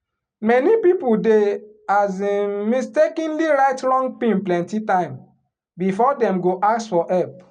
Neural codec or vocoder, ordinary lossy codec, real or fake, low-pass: none; none; real; 9.9 kHz